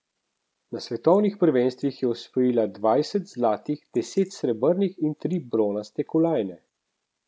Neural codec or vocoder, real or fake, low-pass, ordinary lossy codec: none; real; none; none